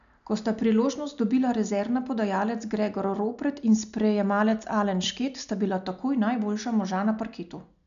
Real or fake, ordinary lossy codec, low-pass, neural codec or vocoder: real; none; 7.2 kHz; none